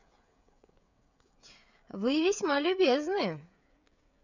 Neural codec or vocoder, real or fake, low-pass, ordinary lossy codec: codec, 16 kHz, 16 kbps, FreqCodec, smaller model; fake; 7.2 kHz; none